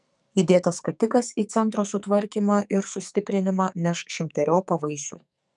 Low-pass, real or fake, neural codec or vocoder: 10.8 kHz; fake; codec, 44.1 kHz, 2.6 kbps, SNAC